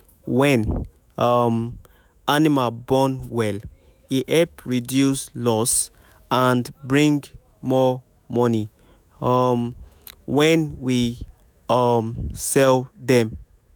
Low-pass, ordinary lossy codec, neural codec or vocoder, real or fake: none; none; autoencoder, 48 kHz, 128 numbers a frame, DAC-VAE, trained on Japanese speech; fake